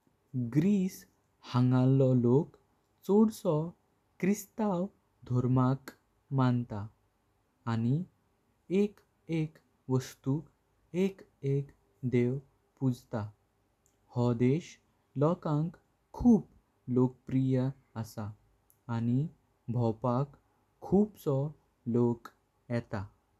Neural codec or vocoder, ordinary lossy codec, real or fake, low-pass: none; none; real; 14.4 kHz